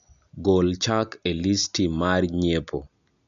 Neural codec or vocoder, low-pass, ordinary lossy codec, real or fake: none; 7.2 kHz; none; real